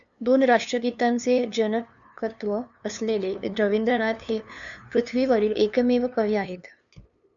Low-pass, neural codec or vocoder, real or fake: 7.2 kHz; codec, 16 kHz, 2 kbps, FunCodec, trained on LibriTTS, 25 frames a second; fake